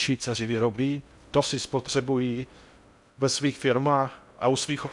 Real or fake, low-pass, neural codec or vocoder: fake; 10.8 kHz; codec, 16 kHz in and 24 kHz out, 0.6 kbps, FocalCodec, streaming, 2048 codes